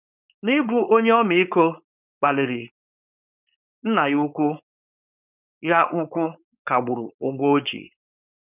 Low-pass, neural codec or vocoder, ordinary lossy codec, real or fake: 3.6 kHz; codec, 16 kHz, 4.8 kbps, FACodec; none; fake